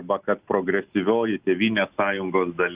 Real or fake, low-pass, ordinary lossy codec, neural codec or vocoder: real; 3.6 kHz; Opus, 64 kbps; none